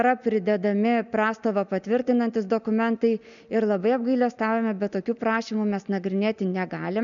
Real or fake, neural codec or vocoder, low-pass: real; none; 7.2 kHz